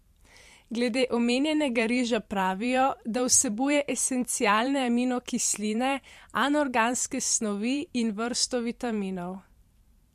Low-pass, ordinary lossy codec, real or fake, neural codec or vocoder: 14.4 kHz; MP3, 64 kbps; fake; vocoder, 44.1 kHz, 128 mel bands every 512 samples, BigVGAN v2